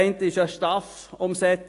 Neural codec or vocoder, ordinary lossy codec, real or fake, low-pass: none; AAC, 48 kbps; real; 10.8 kHz